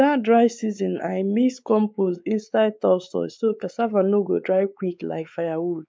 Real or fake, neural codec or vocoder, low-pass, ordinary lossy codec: fake; codec, 16 kHz, 4 kbps, X-Codec, WavLM features, trained on Multilingual LibriSpeech; none; none